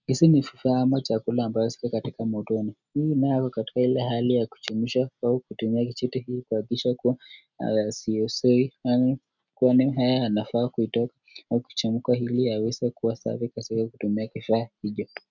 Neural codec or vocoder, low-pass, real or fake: none; 7.2 kHz; real